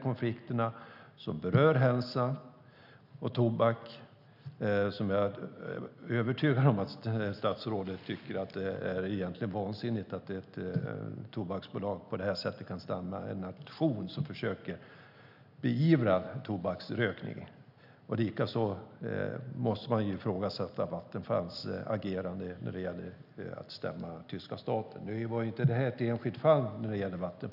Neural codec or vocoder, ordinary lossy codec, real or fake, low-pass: none; none; real; 5.4 kHz